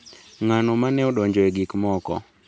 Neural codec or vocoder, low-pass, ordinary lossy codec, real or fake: none; none; none; real